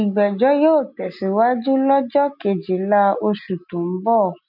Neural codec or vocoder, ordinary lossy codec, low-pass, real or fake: none; none; 5.4 kHz; real